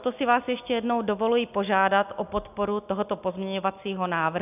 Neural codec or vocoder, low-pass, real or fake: none; 3.6 kHz; real